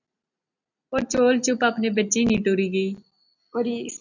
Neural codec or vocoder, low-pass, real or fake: none; 7.2 kHz; real